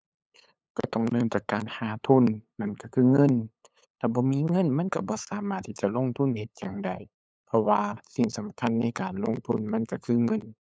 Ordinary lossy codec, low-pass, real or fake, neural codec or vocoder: none; none; fake; codec, 16 kHz, 8 kbps, FunCodec, trained on LibriTTS, 25 frames a second